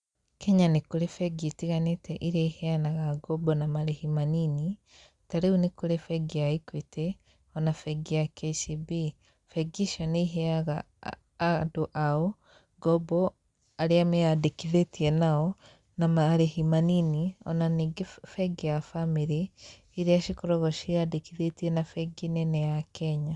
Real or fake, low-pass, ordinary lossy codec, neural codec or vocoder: real; 10.8 kHz; Opus, 64 kbps; none